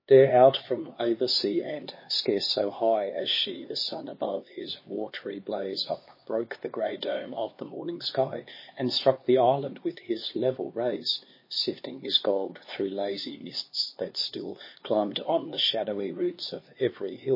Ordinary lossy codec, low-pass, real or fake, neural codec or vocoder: MP3, 24 kbps; 5.4 kHz; fake; codec, 16 kHz, 2 kbps, X-Codec, HuBERT features, trained on LibriSpeech